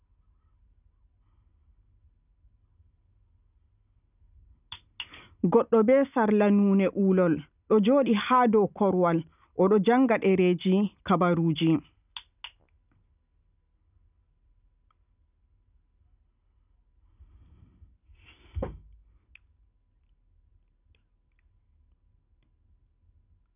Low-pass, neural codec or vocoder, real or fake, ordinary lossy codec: 3.6 kHz; none; real; none